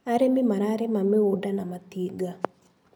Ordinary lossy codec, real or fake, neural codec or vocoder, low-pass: none; real; none; none